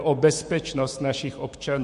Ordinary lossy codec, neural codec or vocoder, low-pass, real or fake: MP3, 48 kbps; autoencoder, 48 kHz, 128 numbers a frame, DAC-VAE, trained on Japanese speech; 14.4 kHz; fake